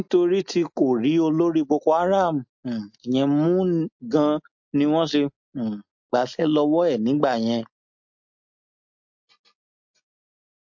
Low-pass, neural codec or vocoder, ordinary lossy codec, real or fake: 7.2 kHz; none; MP3, 48 kbps; real